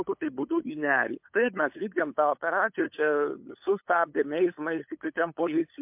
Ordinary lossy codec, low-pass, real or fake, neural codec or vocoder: MP3, 32 kbps; 3.6 kHz; fake; codec, 16 kHz, 8 kbps, FunCodec, trained on LibriTTS, 25 frames a second